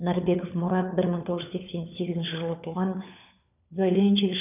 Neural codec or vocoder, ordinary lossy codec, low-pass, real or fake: codec, 16 kHz, 4 kbps, FunCodec, trained on LibriTTS, 50 frames a second; none; 3.6 kHz; fake